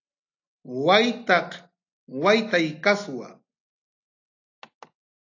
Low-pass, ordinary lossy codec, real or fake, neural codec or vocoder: 7.2 kHz; AAC, 48 kbps; real; none